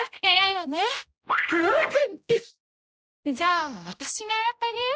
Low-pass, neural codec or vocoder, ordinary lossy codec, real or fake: none; codec, 16 kHz, 0.5 kbps, X-Codec, HuBERT features, trained on general audio; none; fake